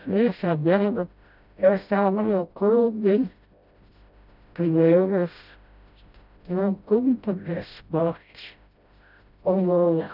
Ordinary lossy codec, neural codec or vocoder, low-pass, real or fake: none; codec, 16 kHz, 0.5 kbps, FreqCodec, smaller model; 5.4 kHz; fake